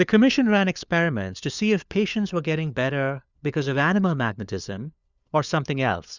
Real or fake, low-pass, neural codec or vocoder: fake; 7.2 kHz; codec, 16 kHz, 4 kbps, FunCodec, trained on LibriTTS, 50 frames a second